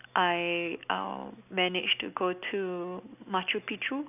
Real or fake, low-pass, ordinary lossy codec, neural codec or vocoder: real; 3.6 kHz; none; none